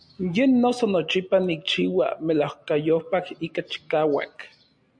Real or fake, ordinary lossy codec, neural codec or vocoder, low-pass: real; MP3, 64 kbps; none; 9.9 kHz